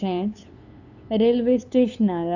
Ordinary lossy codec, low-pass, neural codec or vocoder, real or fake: none; 7.2 kHz; codec, 16 kHz, 8 kbps, FunCodec, trained on LibriTTS, 25 frames a second; fake